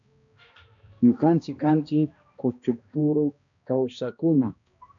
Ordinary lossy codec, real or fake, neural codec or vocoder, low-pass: MP3, 96 kbps; fake; codec, 16 kHz, 1 kbps, X-Codec, HuBERT features, trained on balanced general audio; 7.2 kHz